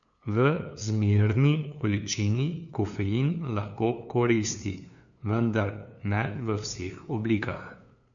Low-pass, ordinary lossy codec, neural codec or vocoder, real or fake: 7.2 kHz; none; codec, 16 kHz, 2 kbps, FunCodec, trained on LibriTTS, 25 frames a second; fake